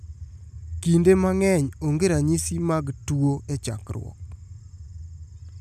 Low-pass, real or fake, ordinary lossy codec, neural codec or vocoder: 14.4 kHz; real; none; none